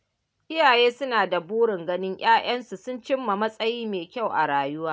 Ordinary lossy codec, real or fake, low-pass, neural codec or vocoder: none; real; none; none